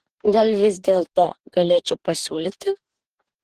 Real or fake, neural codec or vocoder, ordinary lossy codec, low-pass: fake; codec, 44.1 kHz, 2.6 kbps, DAC; Opus, 16 kbps; 14.4 kHz